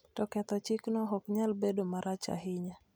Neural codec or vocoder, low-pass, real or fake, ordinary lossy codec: none; none; real; none